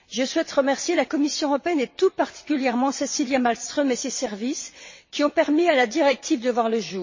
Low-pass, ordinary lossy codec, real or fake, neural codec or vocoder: 7.2 kHz; MP3, 32 kbps; fake; vocoder, 22.05 kHz, 80 mel bands, WaveNeXt